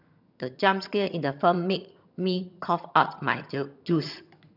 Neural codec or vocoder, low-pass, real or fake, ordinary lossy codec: vocoder, 22.05 kHz, 80 mel bands, HiFi-GAN; 5.4 kHz; fake; none